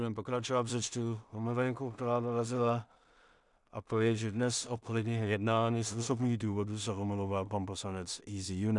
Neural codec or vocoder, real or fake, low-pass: codec, 16 kHz in and 24 kHz out, 0.4 kbps, LongCat-Audio-Codec, two codebook decoder; fake; 10.8 kHz